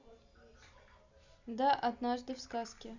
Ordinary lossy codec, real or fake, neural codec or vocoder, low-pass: Opus, 64 kbps; real; none; 7.2 kHz